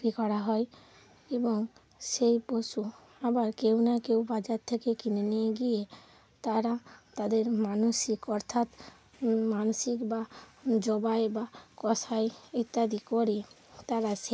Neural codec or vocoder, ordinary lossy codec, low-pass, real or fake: none; none; none; real